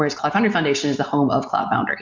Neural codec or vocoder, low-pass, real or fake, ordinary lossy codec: none; 7.2 kHz; real; MP3, 64 kbps